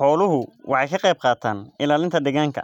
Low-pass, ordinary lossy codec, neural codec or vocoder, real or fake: 19.8 kHz; none; none; real